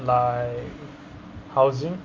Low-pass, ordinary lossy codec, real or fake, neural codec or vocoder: 7.2 kHz; Opus, 32 kbps; real; none